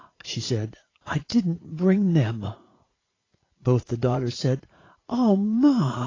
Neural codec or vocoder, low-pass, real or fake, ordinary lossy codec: vocoder, 44.1 kHz, 80 mel bands, Vocos; 7.2 kHz; fake; AAC, 32 kbps